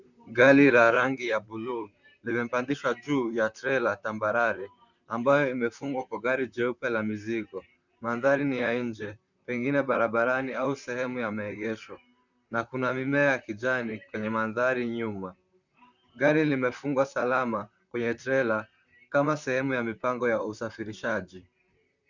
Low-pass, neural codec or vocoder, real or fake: 7.2 kHz; vocoder, 44.1 kHz, 128 mel bands, Pupu-Vocoder; fake